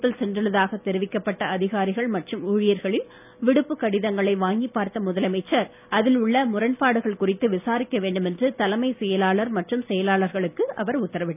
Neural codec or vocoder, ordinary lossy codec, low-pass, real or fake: none; none; 3.6 kHz; real